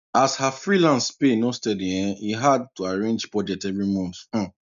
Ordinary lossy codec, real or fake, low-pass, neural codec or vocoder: none; real; 7.2 kHz; none